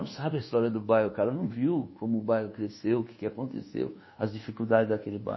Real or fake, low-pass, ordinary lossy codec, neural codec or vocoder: fake; 7.2 kHz; MP3, 24 kbps; codec, 24 kHz, 1.2 kbps, DualCodec